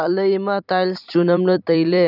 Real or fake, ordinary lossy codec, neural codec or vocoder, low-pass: fake; none; vocoder, 44.1 kHz, 128 mel bands every 256 samples, BigVGAN v2; 5.4 kHz